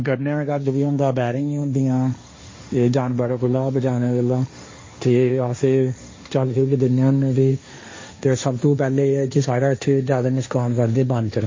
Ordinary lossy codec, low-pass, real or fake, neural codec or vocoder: MP3, 32 kbps; 7.2 kHz; fake; codec, 16 kHz, 1.1 kbps, Voila-Tokenizer